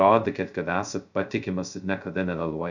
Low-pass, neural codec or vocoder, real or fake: 7.2 kHz; codec, 16 kHz, 0.2 kbps, FocalCodec; fake